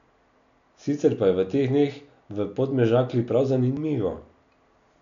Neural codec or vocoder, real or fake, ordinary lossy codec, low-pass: none; real; none; 7.2 kHz